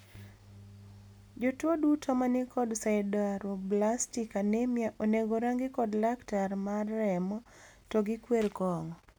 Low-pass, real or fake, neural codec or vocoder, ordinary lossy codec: none; real; none; none